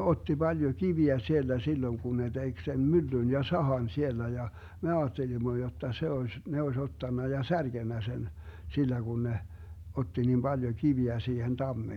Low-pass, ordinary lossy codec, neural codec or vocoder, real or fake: 19.8 kHz; none; none; real